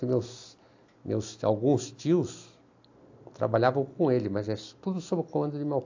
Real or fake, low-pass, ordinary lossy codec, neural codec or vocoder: real; 7.2 kHz; AAC, 48 kbps; none